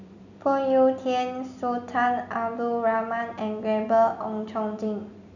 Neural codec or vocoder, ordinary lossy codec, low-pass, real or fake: none; none; 7.2 kHz; real